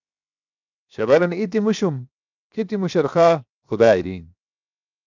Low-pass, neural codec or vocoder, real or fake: 7.2 kHz; codec, 16 kHz, 0.7 kbps, FocalCodec; fake